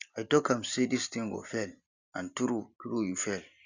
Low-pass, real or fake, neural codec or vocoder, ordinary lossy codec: 7.2 kHz; fake; vocoder, 44.1 kHz, 128 mel bands every 256 samples, BigVGAN v2; Opus, 64 kbps